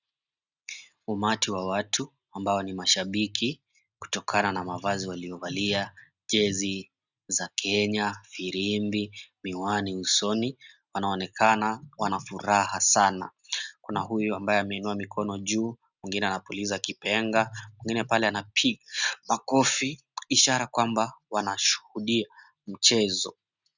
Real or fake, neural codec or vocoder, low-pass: real; none; 7.2 kHz